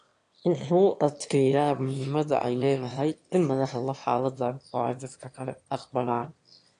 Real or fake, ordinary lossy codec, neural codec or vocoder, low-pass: fake; AAC, 48 kbps; autoencoder, 22.05 kHz, a latent of 192 numbers a frame, VITS, trained on one speaker; 9.9 kHz